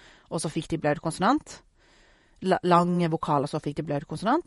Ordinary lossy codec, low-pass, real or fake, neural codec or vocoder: MP3, 48 kbps; 19.8 kHz; fake; vocoder, 48 kHz, 128 mel bands, Vocos